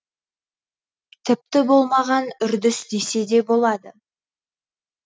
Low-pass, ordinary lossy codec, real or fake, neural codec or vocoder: none; none; real; none